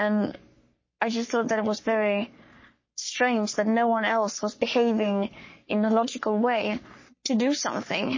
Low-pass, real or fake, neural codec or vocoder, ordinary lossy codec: 7.2 kHz; fake; codec, 44.1 kHz, 3.4 kbps, Pupu-Codec; MP3, 32 kbps